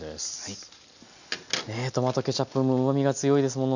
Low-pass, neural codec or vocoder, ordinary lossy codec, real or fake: 7.2 kHz; none; none; real